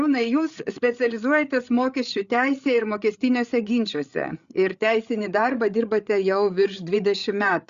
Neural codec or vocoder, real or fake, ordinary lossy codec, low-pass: codec, 16 kHz, 8 kbps, FreqCodec, larger model; fake; Opus, 64 kbps; 7.2 kHz